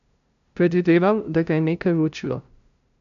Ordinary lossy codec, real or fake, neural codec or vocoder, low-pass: none; fake; codec, 16 kHz, 0.5 kbps, FunCodec, trained on LibriTTS, 25 frames a second; 7.2 kHz